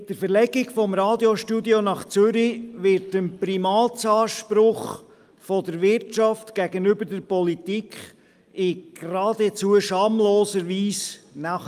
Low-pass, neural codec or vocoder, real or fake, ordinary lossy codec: 14.4 kHz; none; real; Opus, 32 kbps